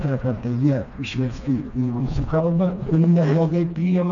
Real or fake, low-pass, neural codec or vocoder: fake; 7.2 kHz; codec, 16 kHz, 2 kbps, FreqCodec, smaller model